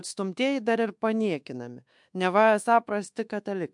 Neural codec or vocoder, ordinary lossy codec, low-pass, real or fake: codec, 24 kHz, 1.2 kbps, DualCodec; MP3, 64 kbps; 10.8 kHz; fake